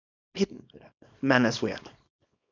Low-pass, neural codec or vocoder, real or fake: 7.2 kHz; codec, 24 kHz, 0.9 kbps, WavTokenizer, small release; fake